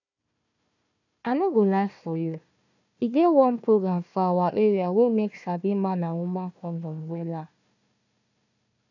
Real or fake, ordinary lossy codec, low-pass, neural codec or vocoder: fake; none; 7.2 kHz; codec, 16 kHz, 1 kbps, FunCodec, trained on Chinese and English, 50 frames a second